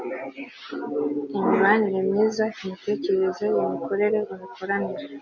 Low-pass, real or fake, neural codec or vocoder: 7.2 kHz; real; none